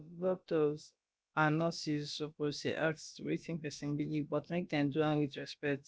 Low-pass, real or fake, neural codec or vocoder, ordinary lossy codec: none; fake; codec, 16 kHz, about 1 kbps, DyCAST, with the encoder's durations; none